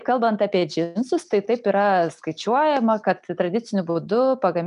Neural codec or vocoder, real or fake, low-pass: none; real; 10.8 kHz